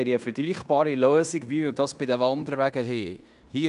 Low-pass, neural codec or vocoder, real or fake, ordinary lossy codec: 10.8 kHz; codec, 16 kHz in and 24 kHz out, 0.9 kbps, LongCat-Audio-Codec, fine tuned four codebook decoder; fake; none